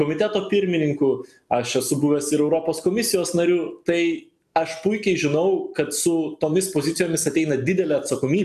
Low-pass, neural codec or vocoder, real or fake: 14.4 kHz; none; real